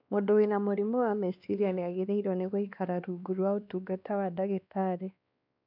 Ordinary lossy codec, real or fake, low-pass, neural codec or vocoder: none; fake; 5.4 kHz; codec, 16 kHz, 2 kbps, X-Codec, WavLM features, trained on Multilingual LibriSpeech